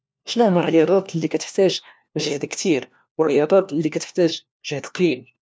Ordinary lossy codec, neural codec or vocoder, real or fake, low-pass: none; codec, 16 kHz, 1 kbps, FunCodec, trained on LibriTTS, 50 frames a second; fake; none